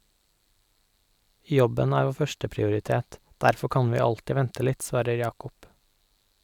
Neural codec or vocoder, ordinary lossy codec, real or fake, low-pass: none; none; real; 19.8 kHz